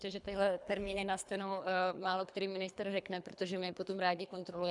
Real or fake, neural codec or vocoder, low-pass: fake; codec, 24 kHz, 3 kbps, HILCodec; 10.8 kHz